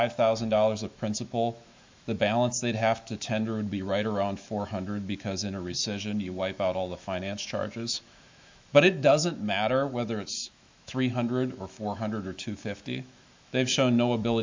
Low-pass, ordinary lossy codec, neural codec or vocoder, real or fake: 7.2 kHz; MP3, 64 kbps; autoencoder, 48 kHz, 128 numbers a frame, DAC-VAE, trained on Japanese speech; fake